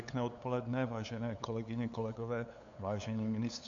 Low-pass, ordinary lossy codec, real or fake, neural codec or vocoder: 7.2 kHz; AAC, 48 kbps; fake; codec, 16 kHz, 8 kbps, FunCodec, trained on LibriTTS, 25 frames a second